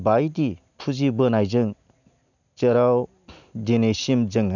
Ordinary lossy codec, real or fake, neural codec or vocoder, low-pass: none; real; none; 7.2 kHz